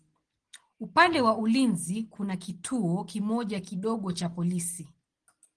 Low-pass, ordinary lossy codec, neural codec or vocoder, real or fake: 9.9 kHz; Opus, 16 kbps; none; real